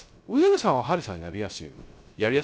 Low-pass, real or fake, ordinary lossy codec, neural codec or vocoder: none; fake; none; codec, 16 kHz, 0.3 kbps, FocalCodec